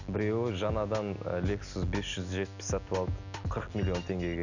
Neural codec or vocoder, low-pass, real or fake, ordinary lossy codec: none; 7.2 kHz; real; none